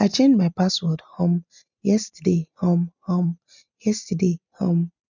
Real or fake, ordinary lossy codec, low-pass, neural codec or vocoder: real; none; 7.2 kHz; none